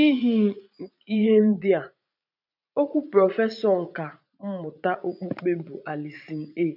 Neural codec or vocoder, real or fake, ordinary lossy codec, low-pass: vocoder, 44.1 kHz, 80 mel bands, Vocos; fake; none; 5.4 kHz